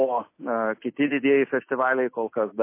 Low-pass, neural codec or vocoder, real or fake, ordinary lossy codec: 3.6 kHz; none; real; MP3, 24 kbps